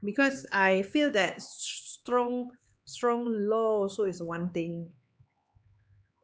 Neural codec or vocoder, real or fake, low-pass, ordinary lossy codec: codec, 16 kHz, 4 kbps, X-Codec, HuBERT features, trained on LibriSpeech; fake; none; none